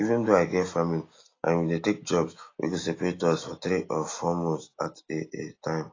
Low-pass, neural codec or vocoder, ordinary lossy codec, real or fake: 7.2 kHz; none; AAC, 32 kbps; real